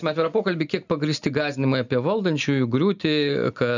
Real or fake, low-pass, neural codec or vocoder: real; 7.2 kHz; none